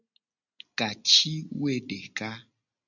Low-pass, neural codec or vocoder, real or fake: 7.2 kHz; none; real